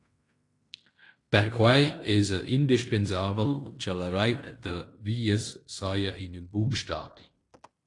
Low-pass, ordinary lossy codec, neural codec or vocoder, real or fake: 10.8 kHz; AAC, 48 kbps; codec, 16 kHz in and 24 kHz out, 0.9 kbps, LongCat-Audio-Codec, fine tuned four codebook decoder; fake